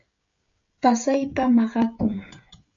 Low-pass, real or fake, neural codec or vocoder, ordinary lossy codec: 7.2 kHz; fake; codec, 16 kHz, 16 kbps, FreqCodec, smaller model; AAC, 64 kbps